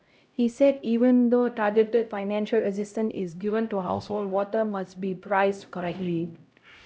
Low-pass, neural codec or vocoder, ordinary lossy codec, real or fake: none; codec, 16 kHz, 0.5 kbps, X-Codec, HuBERT features, trained on LibriSpeech; none; fake